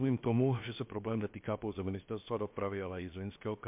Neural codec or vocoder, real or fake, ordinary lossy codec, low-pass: codec, 16 kHz, 0.7 kbps, FocalCodec; fake; MP3, 32 kbps; 3.6 kHz